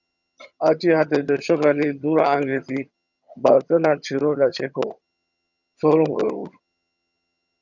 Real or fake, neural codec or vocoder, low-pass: fake; vocoder, 22.05 kHz, 80 mel bands, HiFi-GAN; 7.2 kHz